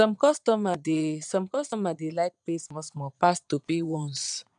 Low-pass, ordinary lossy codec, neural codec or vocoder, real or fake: 9.9 kHz; none; vocoder, 22.05 kHz, 80 mel bands, Vocos; fake